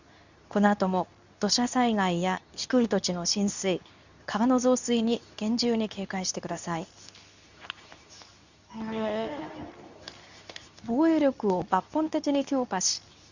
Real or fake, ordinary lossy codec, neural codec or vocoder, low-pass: fake; none; codec, 24 kHz, 0.9 kbps, WavTokenizer, medium speech release version 2; 7.2 kHz